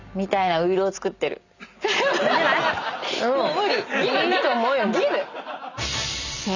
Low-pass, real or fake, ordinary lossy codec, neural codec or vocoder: 7.2 kHz; real; none; none